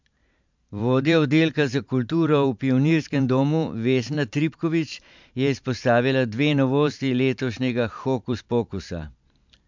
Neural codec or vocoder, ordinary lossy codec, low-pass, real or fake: vocoder, 44.1 kHz, 128 mel bands every 512 samples, BigVGAN v2; MP3, 64 kbps; 7.2 kHz; fake